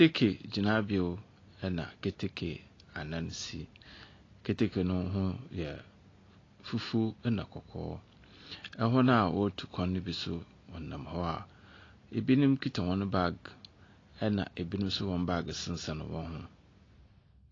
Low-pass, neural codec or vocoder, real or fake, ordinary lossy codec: 7.2 kHz; none; real; AAC, 32 kbps